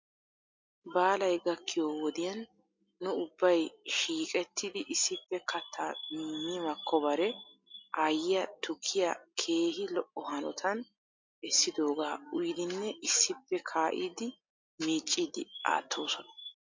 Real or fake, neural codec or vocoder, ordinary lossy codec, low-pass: real; none; MP3, 48 kbps; 7.2 kHz